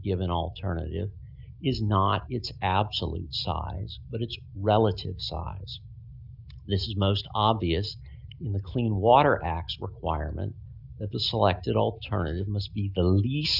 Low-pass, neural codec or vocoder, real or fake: 5.4 kHz; none; real